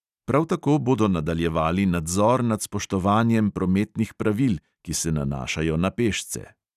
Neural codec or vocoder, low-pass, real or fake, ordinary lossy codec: none; 14.4 kHz; real; none